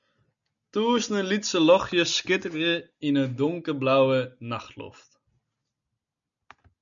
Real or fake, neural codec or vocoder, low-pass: real; none; 7.2 kHz